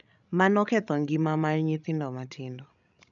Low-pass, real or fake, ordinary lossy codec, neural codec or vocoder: 7.2 kHz; fake; none; codec, 16 kHz, 16 kbps, FreqCodec, larger model